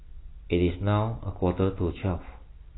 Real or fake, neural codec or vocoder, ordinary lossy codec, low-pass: real; none; AAC, 16 kbps; 7.2 kHz